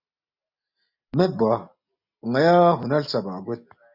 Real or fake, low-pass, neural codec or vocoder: real; 5.4 kHz; none